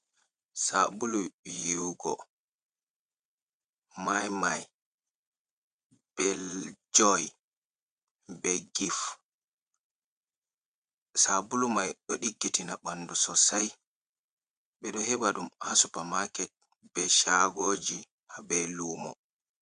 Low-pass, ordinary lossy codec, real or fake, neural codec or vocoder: 9.9 kHz; MP3, 96 kbps; fake; vocoder, 22.05 kHz, 80 mel bands, Vocos